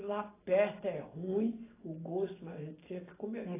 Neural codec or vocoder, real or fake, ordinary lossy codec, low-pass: none; real; AAC, 16 kbps; 3.6 kHz